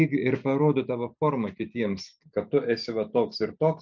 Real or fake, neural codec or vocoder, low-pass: real; none; 7.2 kHz